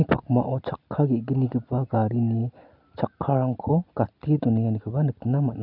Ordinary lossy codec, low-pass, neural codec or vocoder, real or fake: none; 5.4 kHz; none; real